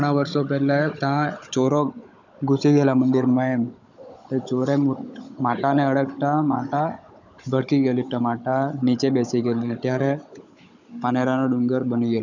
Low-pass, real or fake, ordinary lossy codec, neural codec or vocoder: 7.2 kHz; fake; none; codec, 16 kHz, 16 kbps, FunCodec, trained on Chinese and English, 50 frames a second